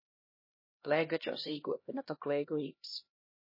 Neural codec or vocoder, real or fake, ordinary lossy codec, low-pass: codec, 16 kHz, 1 kbps, X-Codec, HuBERT features, trained on LibriSpeech; fake; MP3, 24 kbps; 5.4 kHz